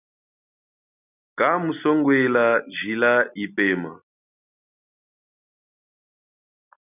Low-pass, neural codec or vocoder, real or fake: 3.6 kHz; none; real